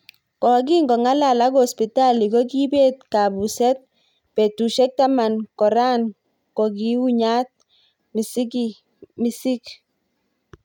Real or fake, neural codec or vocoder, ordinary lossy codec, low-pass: real; none; none; 19.8 kHz